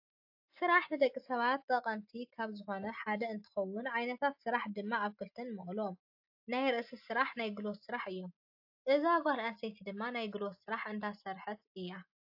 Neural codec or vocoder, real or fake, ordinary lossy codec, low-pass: none; real; AAC, 48 kbps; 5.4 kHz